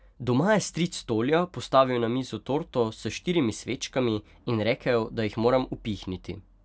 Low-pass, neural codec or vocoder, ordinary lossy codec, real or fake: none; none; none; real